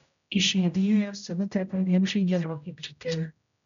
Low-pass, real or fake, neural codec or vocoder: 7.2 kHz; fake; codec, 16 kHz, 0.5 kbps, X-Codec, HuBERT features, trained on general audio